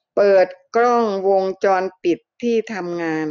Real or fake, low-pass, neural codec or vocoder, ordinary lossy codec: real; 7.2 kHz; none; none